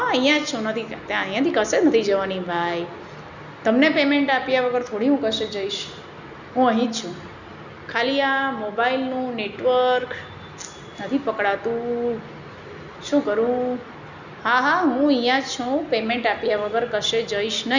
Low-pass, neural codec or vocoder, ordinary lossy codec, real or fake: 7.2 kHz; none; none; real